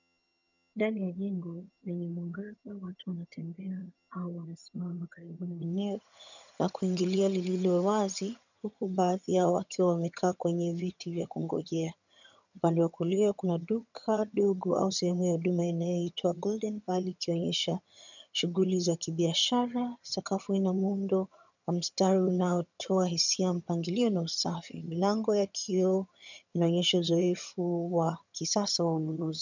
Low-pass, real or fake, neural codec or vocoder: 7.2 kHz; fake; vocoder, 22.05 kHz, 80 mel bands, HiFi-GAN